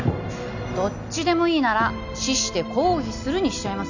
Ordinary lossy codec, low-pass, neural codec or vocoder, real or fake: none; 7.2 kHz; none; real